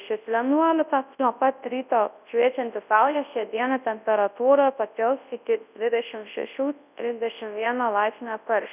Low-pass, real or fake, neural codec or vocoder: 3.6 kHz; fake; codec, 24 kHz, 0.9 kbps, WavTokenizer, large speech release